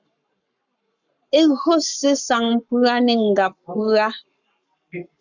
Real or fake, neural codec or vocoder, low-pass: fake; codec, 44.1 kHz, 7.8 kbps, Pupu-Codec; 7.2 kHz